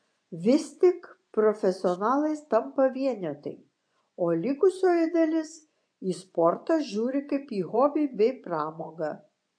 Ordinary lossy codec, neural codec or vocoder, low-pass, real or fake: AAC, 64 kbps; vocoder, 24 kHz, 100 mel bands, Vocos; 9.9 kHz; fake